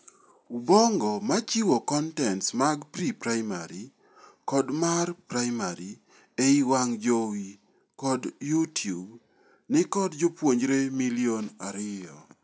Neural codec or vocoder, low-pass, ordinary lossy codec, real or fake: none; none; none; real